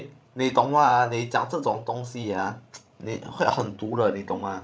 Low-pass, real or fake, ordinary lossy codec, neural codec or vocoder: none; fake; none; codec, 16 kHz, 16 kbps, FreqCodec, larger model